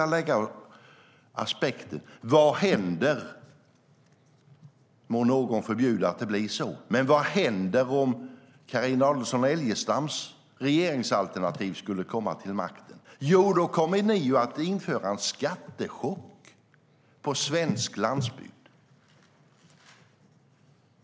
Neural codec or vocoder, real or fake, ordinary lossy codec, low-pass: none; real; none; none